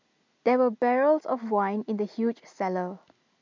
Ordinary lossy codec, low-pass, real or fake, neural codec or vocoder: MP3, 64 kbps; 7.2 kHz; real; none